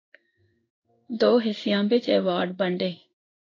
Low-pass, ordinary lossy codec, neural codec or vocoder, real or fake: 7.2 kHz; AAC, 32 kbps; codec, 16 kHz in and 24 kHz out, 1 kbps, XY-Tokenizer; fake